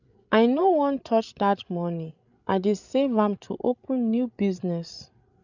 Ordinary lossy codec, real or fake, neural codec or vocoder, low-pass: none; fake; codec, 16 kHz, 16 kbps, FreqCodec, larger model; 7.2 kHz